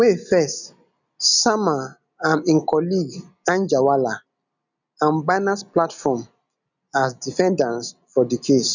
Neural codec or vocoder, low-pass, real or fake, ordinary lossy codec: none; 7.2 kHz; real; none